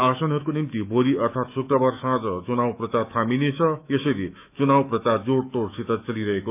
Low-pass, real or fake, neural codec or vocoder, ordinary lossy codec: 3.6 kHz; fake; autoencoder, 48 kHz, 128 numbers a frame, DAC-VAE, trained on Japanese speech; none